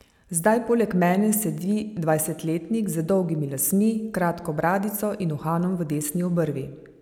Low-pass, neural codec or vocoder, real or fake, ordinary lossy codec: 19.8 kHz; none; real; none